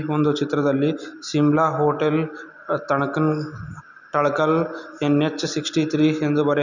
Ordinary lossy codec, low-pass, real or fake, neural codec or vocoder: none; 7.2 kHz; real; none